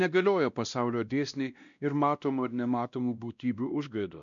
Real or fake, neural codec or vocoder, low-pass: fake; codec, 16 kHz, 1 kbps, X-Codec, WavLM features, trained on Multilingual LibriSpeech; 7.2 kHz